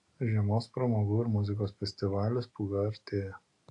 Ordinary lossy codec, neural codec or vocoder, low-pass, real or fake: AAC, 48 kbps; none; 10.8 kHz; real